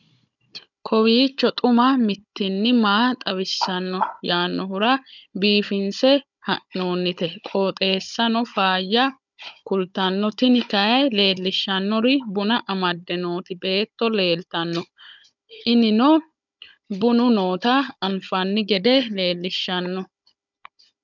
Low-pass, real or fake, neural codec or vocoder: 7.2 kHz; fake; codec, 16 kHz, 16 kbps, FunCodec, trained on Chinese and English, 50 frames a second